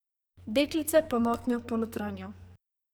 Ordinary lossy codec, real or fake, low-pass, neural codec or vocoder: none; fake; none; codec, 44.1 kHz, 2.6 kbps, SNAC